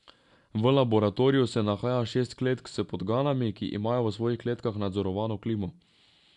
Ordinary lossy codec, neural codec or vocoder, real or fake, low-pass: none; none; real; 10.8 kHz